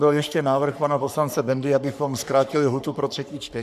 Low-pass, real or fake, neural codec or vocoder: 14.4 kHz; fake; codec, 44.1 kHz, 3.4 kbps, Pupu-Codec